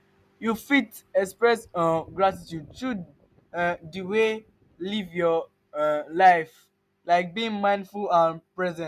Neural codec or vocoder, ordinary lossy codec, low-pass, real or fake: none; none; 14.4 kHz; real